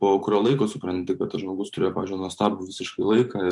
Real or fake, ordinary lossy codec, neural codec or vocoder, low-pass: fake; MP3, 64 kbps; vocoder, 44.1 kHz, 128 mel bands every 512 samples, BigVGAN v2; 10.8 kHz